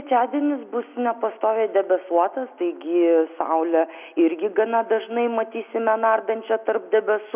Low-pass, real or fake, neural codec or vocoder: 3.6 kHz; real; none